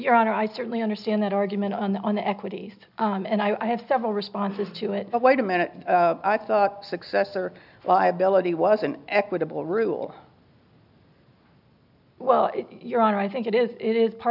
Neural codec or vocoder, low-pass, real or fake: none; 5.4 kHz; real